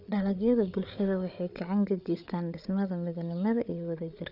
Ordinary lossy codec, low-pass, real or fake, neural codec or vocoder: none; 5.4 kHz; fake; codec, 16 kHz, 8 kbps, FreqCodec, larger model